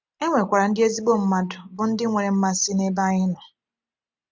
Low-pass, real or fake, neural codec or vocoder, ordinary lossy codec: none; real; none; none